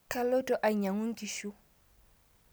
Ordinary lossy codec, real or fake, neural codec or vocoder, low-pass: none; real; none; none